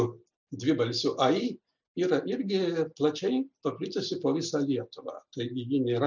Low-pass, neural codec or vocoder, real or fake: 7.2 kHz; none; real